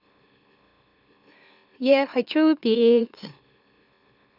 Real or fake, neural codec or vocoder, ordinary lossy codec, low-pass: fake; autoencoder, 44.1 kHz, a latent of 192 numbers a frame, MeloTTS; none; 5.4 kHz